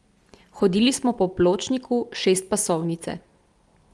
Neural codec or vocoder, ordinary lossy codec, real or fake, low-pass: none; Opus, 32 kbps; real; 10.8 kHz